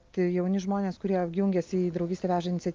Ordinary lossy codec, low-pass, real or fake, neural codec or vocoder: Opus, 24 kbps; 7.2 kHz; real; none